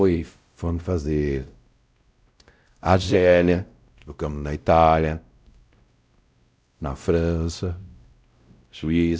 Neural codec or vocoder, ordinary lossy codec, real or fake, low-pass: codec, 16 kHz, 0.5 kbps, X-Codec, WavLM features, trained on Multilingual LibriSpeech; none; fake; none